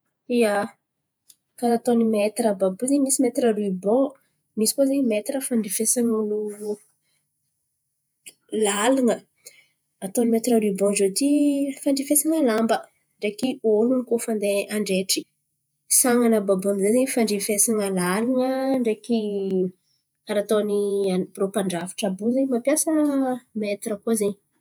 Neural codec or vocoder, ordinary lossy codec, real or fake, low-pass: vocoder, 48 kHz, 128 mel bands, Vocos; none; fake; none